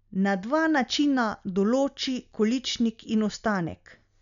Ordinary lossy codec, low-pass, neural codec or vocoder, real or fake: none; 7.2 kHz; none; real